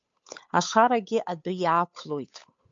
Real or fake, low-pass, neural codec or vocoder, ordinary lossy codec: fake; 7.2 kHz; codec, 16 kHz, 8 kbps, FunCodec, trained on Chinese and English, 25 frames a second; MP3, 48 kbps